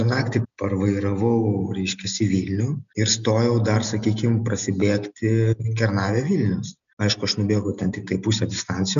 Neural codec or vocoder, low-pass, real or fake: none; 7.2 kHz; real